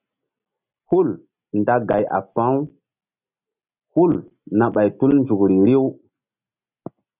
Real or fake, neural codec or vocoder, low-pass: real; none; 3.6 kHz